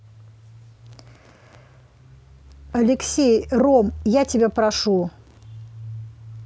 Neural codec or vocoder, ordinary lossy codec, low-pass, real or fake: none; none; none; real